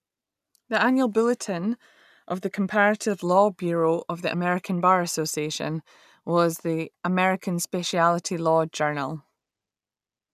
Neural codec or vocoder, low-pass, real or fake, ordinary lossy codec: none; 14.4 kHz; real; none